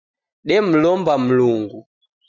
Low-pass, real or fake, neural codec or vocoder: 7.2 kHz; real; none